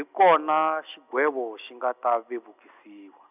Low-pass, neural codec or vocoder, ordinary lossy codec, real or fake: 3.6 kHz; none; none; real